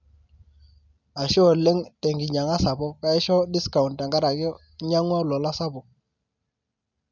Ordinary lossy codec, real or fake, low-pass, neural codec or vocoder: none; real; 7.2 kHz; none